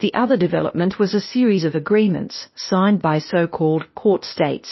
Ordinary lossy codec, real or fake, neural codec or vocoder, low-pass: MP3, 24 kbps; fake; codec, 16 kHz, 0.8 kbps, ZipCodec; 7.2 kHz